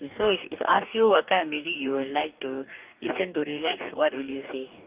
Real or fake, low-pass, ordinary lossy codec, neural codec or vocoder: fake; 3.6 kHz; Opus, 24 kbps; codec, 44.1 kHz, 2.6 kbps, DAC